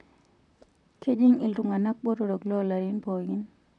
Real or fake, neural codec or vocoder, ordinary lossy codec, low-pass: fake; vocoder, 44.1 kHz, 128 mel bands, Pupu-Vocoder; none; 10.8 kHz